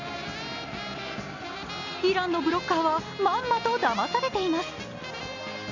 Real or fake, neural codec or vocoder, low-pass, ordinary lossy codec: real; none; 7.2 kHz; none